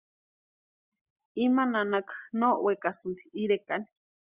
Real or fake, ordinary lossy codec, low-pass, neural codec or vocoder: real; Opus, 64 kbps; 3.6 kHz; none